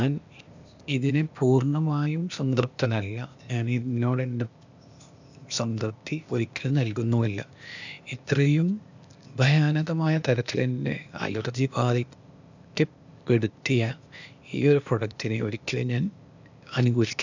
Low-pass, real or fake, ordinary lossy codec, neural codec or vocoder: 7.2 kHz; fake; none; codec, 16 kHz, 0.8 kbps, ZipCodec